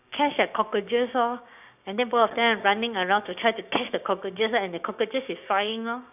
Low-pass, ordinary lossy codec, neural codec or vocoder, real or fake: 3.6 kHz; none; codec, 16 kHz, 6 kbps, DAC; fake